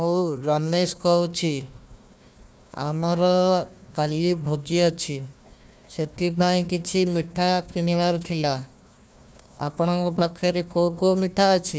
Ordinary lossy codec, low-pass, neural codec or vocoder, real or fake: none; none; codec, 16 kHz, 1 kbps, FunCodec, trained on Chinese and English, 50 frames a second; fake